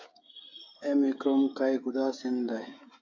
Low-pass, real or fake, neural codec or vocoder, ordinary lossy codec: 7.2 kHz; fake; codec, 16 kHz, 16 kbps, FreqCodec, smaller model; AAC, 48 kbps